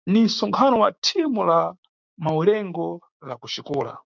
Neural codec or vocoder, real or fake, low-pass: codec, 16 kHz, 6 kbps, DAC; fake; 7.2 kHz